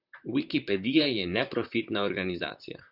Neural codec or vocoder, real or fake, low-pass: vocoder, 44.1 kHz, 128 mel bands, Pupu-Vocoder; fake; 5.4 kHz